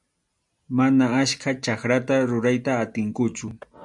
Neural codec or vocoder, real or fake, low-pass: none; real; 10.8 kHz